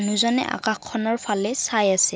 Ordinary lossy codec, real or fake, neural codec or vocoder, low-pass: none; real; none; none